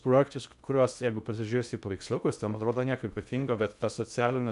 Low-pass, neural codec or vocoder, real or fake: 10.8 kHz; codec, 16 kHz in and 24 kHz out, 0.6 kbps, FocalCodec, streaming, 2048 codes; fake